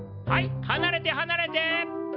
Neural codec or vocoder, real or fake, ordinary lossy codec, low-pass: none; real; none; 5.4 kHz